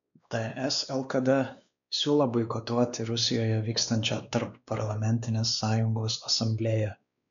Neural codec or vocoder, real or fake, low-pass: codec, 16 kHz, 2 kbps, X-Codec, WavLM features, trained on Multilingual LibriSpeech; fake; 7.2 kHz